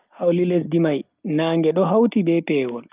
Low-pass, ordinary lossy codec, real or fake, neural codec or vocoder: 3.6 kHz; Opus, 24 kbps; real; none